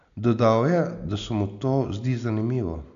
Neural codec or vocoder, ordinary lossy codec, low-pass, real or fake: none; AAC, 64 kbps; 7.2 kHz; real